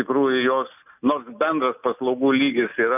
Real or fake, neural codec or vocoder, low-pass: real; none; 3.6 kHz